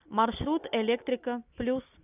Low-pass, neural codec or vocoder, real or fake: 3.6 kHz; none; real